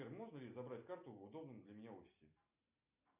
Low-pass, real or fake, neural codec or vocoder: 3.6 kHz; real; none